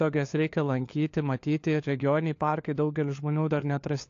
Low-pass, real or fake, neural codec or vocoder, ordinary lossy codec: 7.2 kHz; fake; codec, 16 kHz, 4 kbps, FunCodec, trained on LibriTTS, 50 frames a second; AAC, 48 kbps